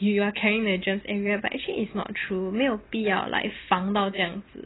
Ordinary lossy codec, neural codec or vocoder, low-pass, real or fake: AAC, 16 kbps; none; 7.2 kHz; real